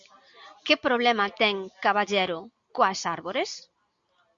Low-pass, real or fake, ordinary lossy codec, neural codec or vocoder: 7.2 kHz; real; Opus, 64 kbps; none